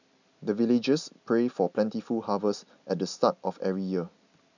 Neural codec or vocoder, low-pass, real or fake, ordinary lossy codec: none; 7.2 kHz; real; none